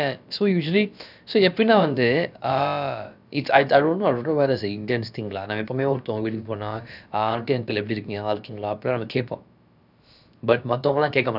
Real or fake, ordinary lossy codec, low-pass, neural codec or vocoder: fake; none; 5.4 kHz; codec, 16 kHz, about 1 kbps, DyCAST, with the encoder's durations